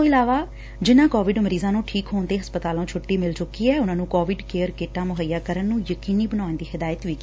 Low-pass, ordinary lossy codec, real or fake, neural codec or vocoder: none; none; real; none